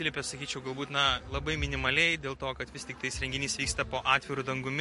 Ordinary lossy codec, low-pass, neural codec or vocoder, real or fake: MP3, 48 kbps; 14.4 kHz; none; real